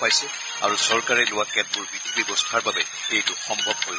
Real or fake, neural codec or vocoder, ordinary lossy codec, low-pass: real; none; none; none